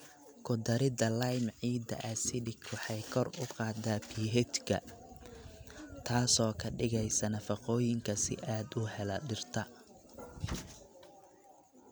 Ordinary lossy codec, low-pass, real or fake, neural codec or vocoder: none; none; real; none